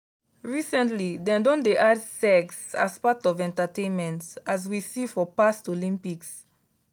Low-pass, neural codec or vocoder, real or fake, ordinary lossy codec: none; none; real; none